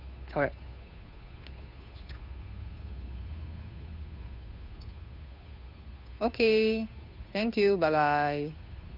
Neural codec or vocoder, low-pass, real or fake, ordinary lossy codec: codec, 16 kHz, 2 kbps, FunCodec, trained on Chinese and English, 25 frames a second; 5.4 kHz; fake; none